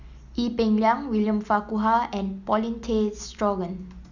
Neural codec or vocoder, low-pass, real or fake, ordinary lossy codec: none; 7.2 kHz; real; none